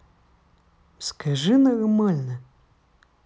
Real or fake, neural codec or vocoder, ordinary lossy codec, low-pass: real; none; none; none